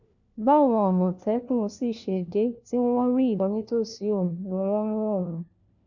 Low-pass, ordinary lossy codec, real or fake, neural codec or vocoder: 7.2 kHz; none; fake; codec, 16 kHz, 1 kbps, FunCodec, trained on LibriTTS, 50 frames a second